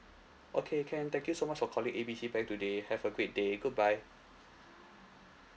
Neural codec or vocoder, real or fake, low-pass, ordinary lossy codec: none; real; none; none